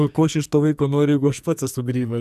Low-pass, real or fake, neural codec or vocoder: 14.4 kHz; fake; codec, 44.1 kHz, 2.6 kbps, SNAC